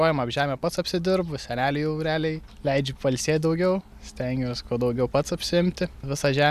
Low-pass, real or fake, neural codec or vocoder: 14.4 kHz; real; none